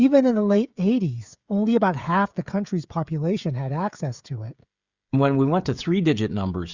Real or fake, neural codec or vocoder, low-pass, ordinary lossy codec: fake; codec, 16 kHz, 16 kbps, FreqCodec, smaller model; 7.2 kHz; Opus, 64 kbps